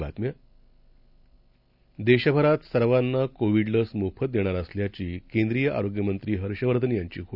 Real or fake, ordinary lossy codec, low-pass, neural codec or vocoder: real; none; 5.4 kHz; none